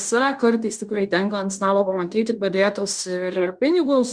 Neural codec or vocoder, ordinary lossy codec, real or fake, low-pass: codec, 16 kHz in and 24 kHz out, 0.9 kbps, LongCat-Audio-Codec, fine tuned four codebook decoder; Opus, 64 kbps; fake; 9.9 kHz